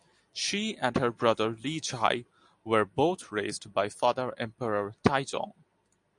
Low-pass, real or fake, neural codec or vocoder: 10.8 kHz; real; none